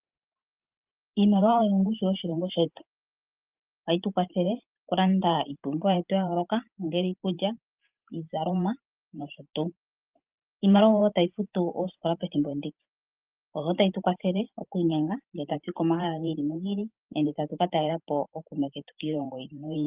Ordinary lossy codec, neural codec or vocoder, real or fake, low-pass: Opus, 24 kbps; vocoder, 44.1 kHz, 128 mel bands every 512 samples, BigVGAN v2; fake; 3.6 kHz